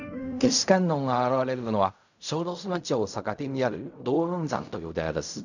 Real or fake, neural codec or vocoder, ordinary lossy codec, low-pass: fake; codec, 16 kHz in and 24 kHz out, 0.4 kbps, LongCat-Audio-Codec, fine tuned four codebook decoder; none; 7.2 kHz